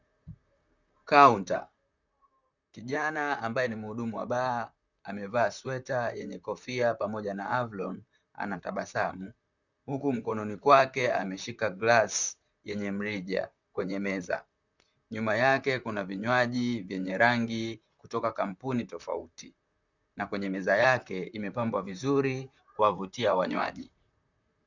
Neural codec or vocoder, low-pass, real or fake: vocoder, 44.1 kHz, 128 mel bands, Pupu-Vocoder; 7.2 kHz; fake